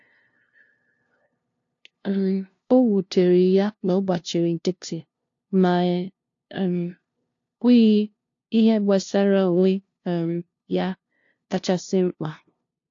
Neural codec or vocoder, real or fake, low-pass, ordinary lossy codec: codec, 16 kHz, 0.5 kbps, FunCodec, trained on LibriTTS, 25 frames a second; fake; 7.2 kHz; AAC, 48 kbps